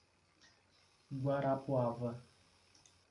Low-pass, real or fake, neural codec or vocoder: 10.8 kHz; real; none